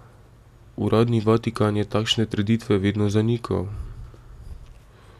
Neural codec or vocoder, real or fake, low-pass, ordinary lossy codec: none; real; 14.4 kHz; MP3, 96 kbps